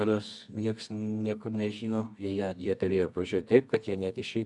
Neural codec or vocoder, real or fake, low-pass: codec, 24 kHz, 0.9 kbps, WavTokenizer, medium music audio release; fake; 10.8 kHz